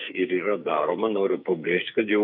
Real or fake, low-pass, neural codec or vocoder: fake; 5.4 kHz; codec, 44.1 kHz, 2.6 kbps, SNAC